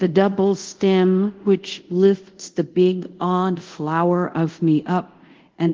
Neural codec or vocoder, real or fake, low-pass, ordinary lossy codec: codec, 24 kHz, 0.5 kbps, DualCodec; fake; 7.2 kHz; Opus, 16 kbps